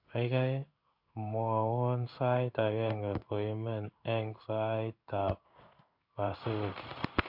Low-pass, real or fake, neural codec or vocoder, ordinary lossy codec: 5.4 kHz; fake; codec, 16 kHz in and 24 kHz out, 1 kbps, XY-Tokenizer; MP3, 48 kbps